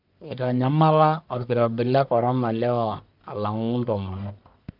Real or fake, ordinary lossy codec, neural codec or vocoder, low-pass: fake; none; codec, 44.1 kHz, 1.7 kbps, Pupu-Codec; 5.4 kHz